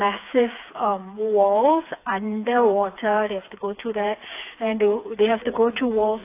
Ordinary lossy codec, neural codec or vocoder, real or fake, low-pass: AAC, 24 kbps; codec, 16 kHz, 4 kbps, FreqCodec, smaller model; fake; 3.6 kHz